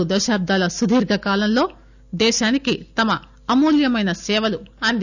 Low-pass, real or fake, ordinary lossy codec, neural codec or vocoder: 7.2 kHz; real; none; none